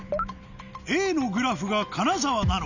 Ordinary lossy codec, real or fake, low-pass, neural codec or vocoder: none; real; 7.2 kHz; none